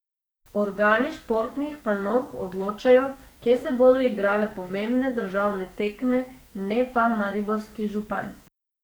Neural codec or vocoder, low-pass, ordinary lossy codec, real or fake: codec, 44.1 kHz, 2.6 kbps, SNAC; none; none; fake